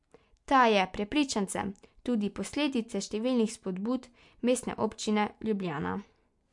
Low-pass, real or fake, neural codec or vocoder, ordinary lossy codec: 10.8 kHz; real; none; MP3, 64 kbps